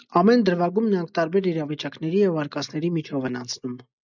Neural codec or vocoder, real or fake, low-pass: none; real; 7.2 kHz